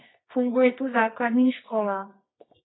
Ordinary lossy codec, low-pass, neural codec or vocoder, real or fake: AAC, 16 kbps; 7.2 kHz; codec, 24 kHz, 0.9 kbps, WavTokenizer, medium music audio release; fake